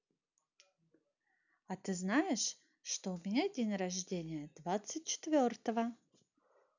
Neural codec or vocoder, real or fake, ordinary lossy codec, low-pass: none; real; none; 7.2 kHz